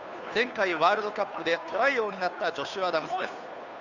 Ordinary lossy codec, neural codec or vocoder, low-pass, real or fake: none; codec, 16 kHz, 2 kbps, FunCodec, trained on Chinese and English, 25 frames a second; 7.2 kHz; fake